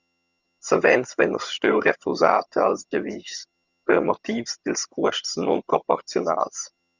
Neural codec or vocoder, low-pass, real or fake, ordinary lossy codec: vocoder, 22.05 kHz, 80 mel bands, HiFi-GAN; 7.2 kHz; fake; Opus, 64 kbps